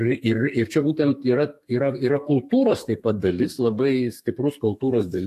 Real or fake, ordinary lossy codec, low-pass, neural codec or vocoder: fake; AAC, 64 kbps; 14.4 kHz; codec, 32 kHz, 1.9 kbps, SNAC